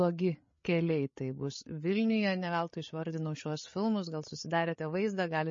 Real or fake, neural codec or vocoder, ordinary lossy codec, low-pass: fake; codec, 16 kHz, 8 kbps, FreqCodec, larger model; MP3, 32 kbps; 7.2 kHz